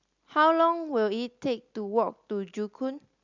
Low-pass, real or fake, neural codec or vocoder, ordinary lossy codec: 7.2 kHz; real; none; Opus, 64 kbps